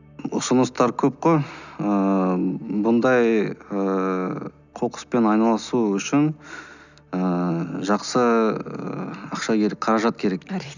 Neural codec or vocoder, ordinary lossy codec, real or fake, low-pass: none; none; real; 7.2 kHz